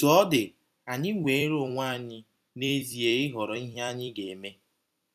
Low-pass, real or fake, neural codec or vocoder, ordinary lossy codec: 14.4 kHz; fake; vocoder, 44.1 kHz, 128 mel bands every 256 samples, BigVGAN v2; none